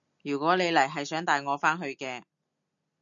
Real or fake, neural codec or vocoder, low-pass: real; none; 7.2 kHz